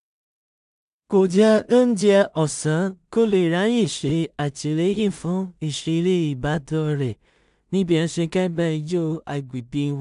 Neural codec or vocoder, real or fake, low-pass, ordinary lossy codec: codec, 16 kHz in and 24 kHz out, 0.4 kbps, LongCat-Audio-Codec, two codebook decoder; fake; 10.8 kHz; none